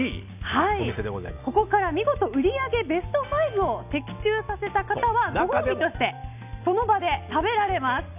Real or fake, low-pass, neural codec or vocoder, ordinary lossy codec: real; 3.6 kHz; none; none